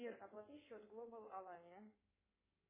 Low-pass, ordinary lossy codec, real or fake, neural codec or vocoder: 3.6 kHz; MP3, 16 kbps; fake; codec, 16 kHz in and 24 kHz out, 2.2 kbps, FireRedTTS-2 codec